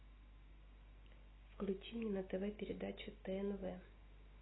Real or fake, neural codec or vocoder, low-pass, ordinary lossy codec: real; none; 7.2 kHz; AAC, 16 kbps